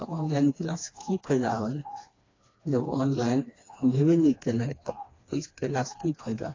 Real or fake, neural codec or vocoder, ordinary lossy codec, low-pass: fake; codec, 16 kHz, 2 kbps, FreqCodec, smaller model; AAC, 32 kbps; 7.2 kHz